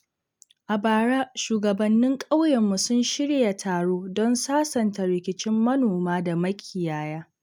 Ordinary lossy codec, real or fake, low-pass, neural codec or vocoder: none; real; 19.8 kHz; none